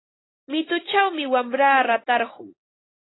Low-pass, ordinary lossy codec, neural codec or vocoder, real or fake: 7.2 kHz; AAC, 16 kbps; none; real